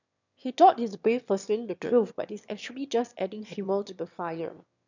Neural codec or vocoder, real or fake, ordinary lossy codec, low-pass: autoencoder, 22.05 kHz, a latent of 192 numbers a frame, VITS, trained on one speaker; fake; none; 7.2 kHz